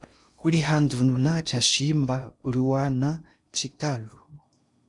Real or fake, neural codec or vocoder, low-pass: fake; codec, 16 kHz in and 24 kHz out, 0.6 kbps, FocalCodec, streaming, 4096 codes; 10.8 kHz